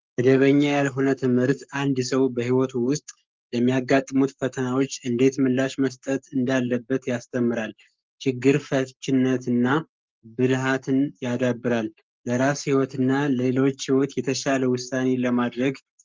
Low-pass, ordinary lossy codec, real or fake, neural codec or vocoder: 7.2 kHz; Opus, 24 kbps; fake; codec, 44.1 kHz, 7.8 kbps, Pupu-Codec